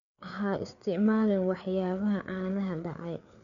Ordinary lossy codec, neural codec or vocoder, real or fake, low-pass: none; codec, 16 kHz, 4 kbps, FreqCodec, larger model; fake; 7.2 kHz